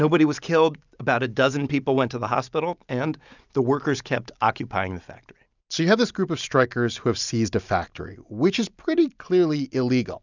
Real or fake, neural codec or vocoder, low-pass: real; none; 7.2 kHz